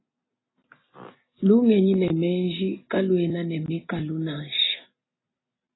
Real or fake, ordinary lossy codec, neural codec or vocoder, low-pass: real; AAC, 16 kbps; none; 7.2 kHz